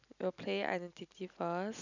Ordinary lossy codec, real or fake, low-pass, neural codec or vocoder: none; real; 7.2 kHz; none